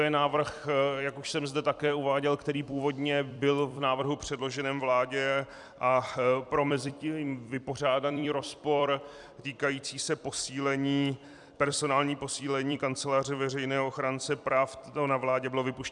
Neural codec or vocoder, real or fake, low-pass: vocoder, 44.1 kHz, 128 mel bands every 256 samples, BigVGAN v2; fake; 10.8 kHz